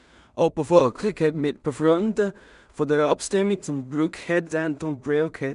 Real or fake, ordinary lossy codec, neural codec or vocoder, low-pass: fake; none; codec, 16 kHz in and 24 kHz out, 0.4 kbps, LongCat-Audio-Codec, two codebook decoder; 10.8 kHz